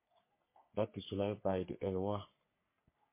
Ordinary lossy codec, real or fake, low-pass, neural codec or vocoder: MP3, 24 kbps; fake; 3.6 kHz; codec, 44.1 kHz, 3.4 kbps, Pupu-Codec